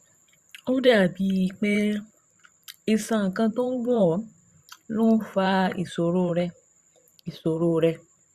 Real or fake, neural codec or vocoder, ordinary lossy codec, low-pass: fake; vocoder, 44.1 kHz, 128 mel bands every 512 samples, BigVGAN v2; Opus, 64 kbps; 14.4 kHz